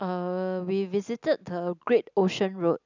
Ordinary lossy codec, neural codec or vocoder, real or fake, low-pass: none; none; real; 7.2 kHz